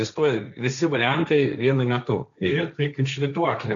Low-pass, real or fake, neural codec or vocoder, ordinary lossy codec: 7.2 kHz; fake; codec, 16 kHz, 1.1 kbps, Voila-Tokenizer; MP3, 96 kbps